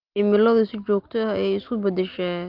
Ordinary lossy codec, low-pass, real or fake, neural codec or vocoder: Opus, 32 kbps; 5.4 kHz; real; none